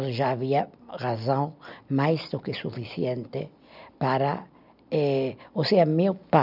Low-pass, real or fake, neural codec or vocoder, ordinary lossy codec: 5.4 kHz; real; none; none